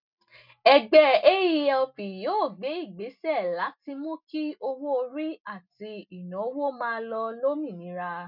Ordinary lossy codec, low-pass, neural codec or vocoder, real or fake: none; 5.4 kHz; none; real